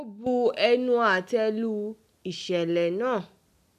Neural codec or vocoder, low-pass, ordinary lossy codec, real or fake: none; 14.4 kHz; none; real